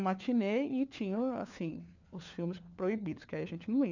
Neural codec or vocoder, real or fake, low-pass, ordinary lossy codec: codec, 16 kHz, 4 kbps, FunCodec, trained on LibriTTS, 50 frames a second; fake; 7.2 kHz; none